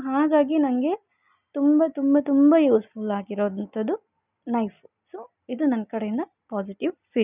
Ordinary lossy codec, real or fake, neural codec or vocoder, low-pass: none; real; none; 3.6 kHz